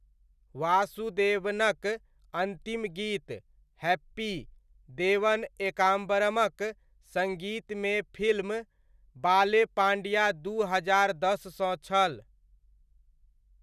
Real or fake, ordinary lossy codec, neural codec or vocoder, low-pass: real; none; none; 14.4 kHz